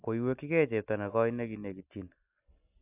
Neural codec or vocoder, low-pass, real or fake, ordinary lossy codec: none; 3.6 kHz; real; AAC, 24 kbps